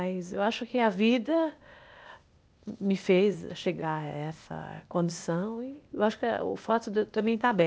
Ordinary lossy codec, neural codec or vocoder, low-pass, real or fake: none; codec, 16 kHz, 0.8 kbps, ZipCodec; none; fake